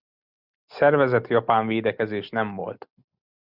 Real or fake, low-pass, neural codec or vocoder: real; 5.4 kHz; none